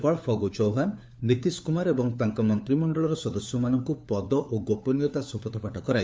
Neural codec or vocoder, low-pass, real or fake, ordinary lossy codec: codec, 16 kHz, 4 kbps, FunCodec, trained on LibriTTS, 50 frames a second; none; fake; none